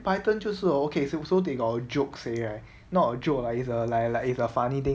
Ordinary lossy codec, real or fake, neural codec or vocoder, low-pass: none; real; none; none